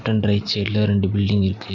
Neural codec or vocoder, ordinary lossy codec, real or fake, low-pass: none; none; real; 7.2 kHz